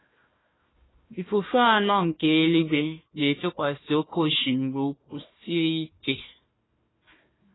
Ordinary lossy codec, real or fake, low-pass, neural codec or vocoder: AAC, 16 kbps; fake; 7.2 kHz; codec, 16 kHz, 1 kbps, FunCodec, trained on Chinese and English, 50 frames a second